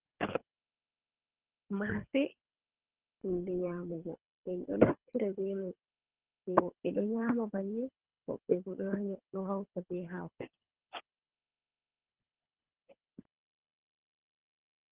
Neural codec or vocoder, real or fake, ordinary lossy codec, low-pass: codec, 24 kHz, 3 kbps, HILCodec; fake; Opus, 16 kbps; 3.6 kHz